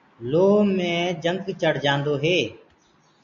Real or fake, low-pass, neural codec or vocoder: real; 7.2 kHz; none